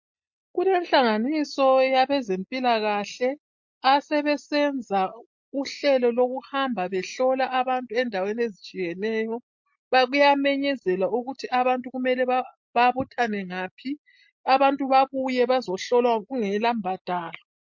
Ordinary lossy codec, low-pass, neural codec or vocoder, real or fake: MP3, 48 kbps; 7.2 kHz; none; real